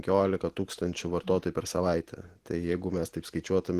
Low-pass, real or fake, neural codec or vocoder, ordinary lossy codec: 14.4 kHz; real; none; Opus, 16 kbps